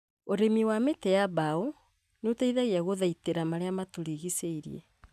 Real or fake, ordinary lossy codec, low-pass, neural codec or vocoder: real; none; 14.4 kHz; none